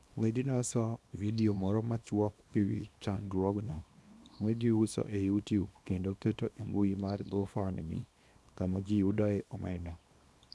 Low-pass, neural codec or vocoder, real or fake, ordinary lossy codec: none; codec, 24 kHz, 0.9 kbps, WavTokenizer, small release; fake; none